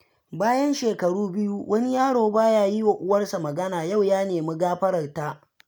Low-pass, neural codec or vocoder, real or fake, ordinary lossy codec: none; none; real; none